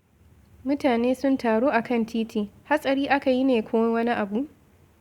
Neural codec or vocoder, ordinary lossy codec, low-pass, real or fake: none; Opus, 64 kbps; 19.8 kHz; real